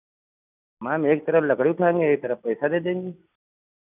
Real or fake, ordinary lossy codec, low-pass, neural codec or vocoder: real; none; 3.6 kHz; none